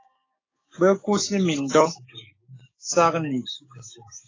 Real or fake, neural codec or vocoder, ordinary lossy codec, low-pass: fake; codec, 16 kHz, 6 kbps, DAC; AAC, 32 kbps; 7.2 kHz